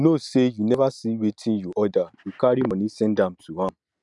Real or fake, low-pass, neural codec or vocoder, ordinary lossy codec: real; 10.8 kHz; none; none